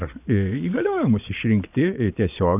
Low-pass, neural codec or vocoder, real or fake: 3.6 kHz; vocoder, 22.05 kHz, 80 mel bands, Vocos; fake